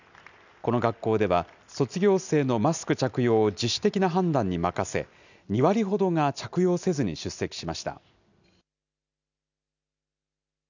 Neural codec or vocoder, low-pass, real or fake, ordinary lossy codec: none; 7.2 kHz; real; none